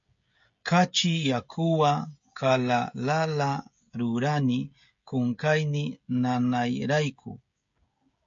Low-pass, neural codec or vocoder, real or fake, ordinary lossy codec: 7.2 kHz; codec, 16 kHz, 16 kbps, FreqCodec, smaller model; fake; MP3, 48 kbps